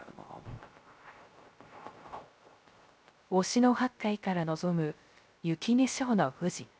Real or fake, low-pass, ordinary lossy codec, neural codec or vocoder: fake; none; none; codec, 16 kHz, 0.3 kbps, FocalCodec